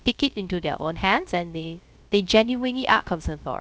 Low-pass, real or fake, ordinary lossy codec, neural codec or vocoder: none; fake; none; codec, 16 kHz, 0.3 kbps, FocalCodec